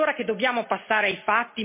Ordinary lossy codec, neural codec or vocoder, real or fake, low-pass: MP3, 24 kbps; none; real; 3.6 kHz